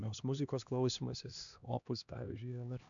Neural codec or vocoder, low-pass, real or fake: codec, 16 kHz, 2 kbps, X-Codec, HuBERT features, trained on LibriSpeech; 7.2 kHz; fake